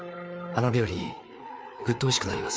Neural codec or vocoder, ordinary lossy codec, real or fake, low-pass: codec, 16 kHz, 4 kbps, FreqCodec, larger model; none; fake; none